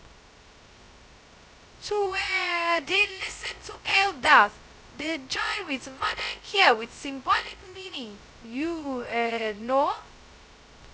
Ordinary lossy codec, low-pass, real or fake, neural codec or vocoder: none; none; fake; codec, 16 kHz, 0.2 kbps, FocalCodec